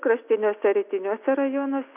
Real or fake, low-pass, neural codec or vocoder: real; 3.6 kHz; none